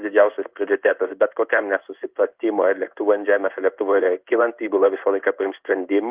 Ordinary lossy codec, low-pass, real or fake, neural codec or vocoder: Opus, 32 kbps; 3.6 kHz; fake; codec, 16 kHz in and 24 kHz out, 1 kbps, XY-Tokenizer